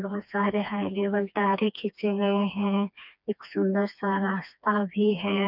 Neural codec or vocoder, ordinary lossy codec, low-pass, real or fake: codec, 16 kHz, 2 kbps, FreqCodec, smaller model; none; 5.4 kHz; fake